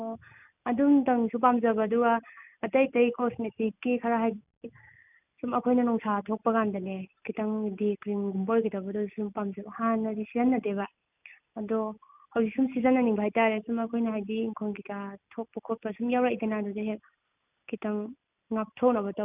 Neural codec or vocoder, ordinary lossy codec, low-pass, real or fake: none; none; 3.6 kHz; real